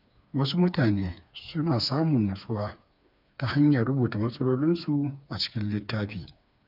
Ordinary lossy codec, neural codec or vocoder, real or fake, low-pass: none; codec, 16 kHz, 4 kbps, FreqCodec, smaller model; fake; 5.4 kHz